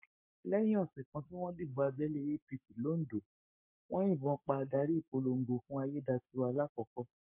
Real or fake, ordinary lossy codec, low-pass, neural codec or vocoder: fake; MP3, 32 kbps; 3.6 kHz; codec, 44.1 kHz, 7.8 kbps, Pupu-Codec